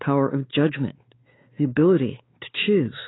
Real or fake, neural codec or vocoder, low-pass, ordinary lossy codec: fake; codec, 16 kHz, 4 kbps, X-Codec, WavLM features, trained on Multilingual LibriSpeech; 7.2 kHz; AAC, 16 kbps